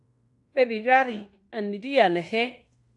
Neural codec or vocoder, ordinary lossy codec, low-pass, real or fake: codec, 16 kHz in and 24 kHz out, 0.9 kbps, LongCat-Audio-Codec, fine tuned four codebook decoder; AAC, 64 kbps; 10.8 kHz; fake